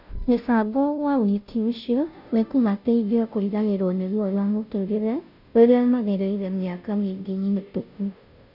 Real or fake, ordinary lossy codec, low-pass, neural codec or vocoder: fake; none; 5.4 kHz; codec, 16 kHz, 0.5 kbps, FunCodec, trained on Chinese and English, 25 frames a second